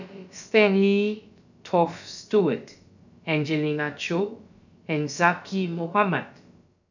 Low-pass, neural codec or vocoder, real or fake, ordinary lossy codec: 7.2 kHz; codec, 16 kHz, about 1 kbps, DyCAST, with the encoder's durations; fake; none